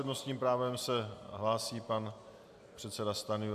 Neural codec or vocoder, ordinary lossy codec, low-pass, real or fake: none; AAC, 96 kbps; 14.4 kHz; real